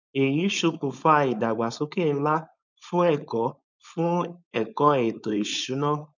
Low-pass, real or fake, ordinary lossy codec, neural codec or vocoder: 7.2 kHz; fake; none; codec, 16 kHz, 4.8 kbps, FACodec